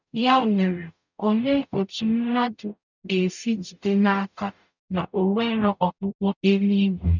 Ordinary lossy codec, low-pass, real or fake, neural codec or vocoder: none; 7.2 kHz; fake; codec, 44.1 kHz, 0.9 kbps, DAC